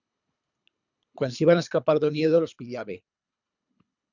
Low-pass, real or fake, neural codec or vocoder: 7.2 kHz; fake; codec, 24 kHz, 3 kbps, HILCodec